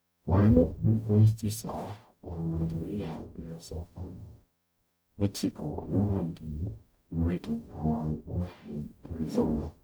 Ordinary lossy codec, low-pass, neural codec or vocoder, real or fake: none; none; codec, 44.1 kHz, 0.9 kbps, DAC; fake